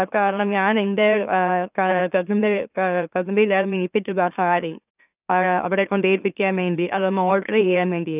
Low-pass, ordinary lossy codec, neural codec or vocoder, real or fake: 3.6 kHz; none; autoencoder, 44.1 kHz, a latent of 192 numbers a frame, MeloTTS; fake